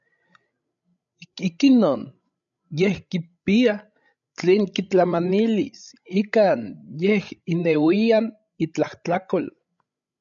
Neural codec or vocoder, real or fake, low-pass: codec, 16 kHz, 16 kbps, FreqCodec, larger model; fake; 7.2 kHz